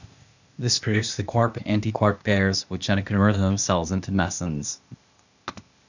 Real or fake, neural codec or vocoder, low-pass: fake; codec, 16 kHz, 0.8 kbps, ZipCodec; 7.2 kHz